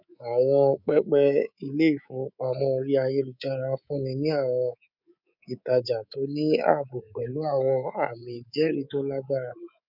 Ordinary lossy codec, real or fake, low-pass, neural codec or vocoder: none; fake; 5.4 kHz; codec, 24 kHz, 3.1 kbps, DualCodec